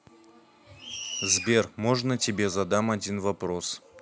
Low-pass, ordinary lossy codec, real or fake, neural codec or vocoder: none; none; real; none